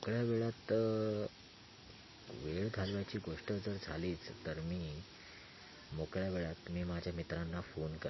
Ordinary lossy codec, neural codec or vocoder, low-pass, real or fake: MP3, 24 kbps; none; 7.2 kHz; real